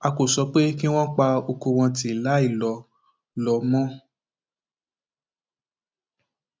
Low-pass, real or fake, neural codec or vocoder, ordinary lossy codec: none; real; none; none